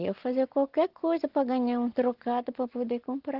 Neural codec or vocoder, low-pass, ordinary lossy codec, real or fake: none; 5.4 kHz; Opus, 16 kbps; real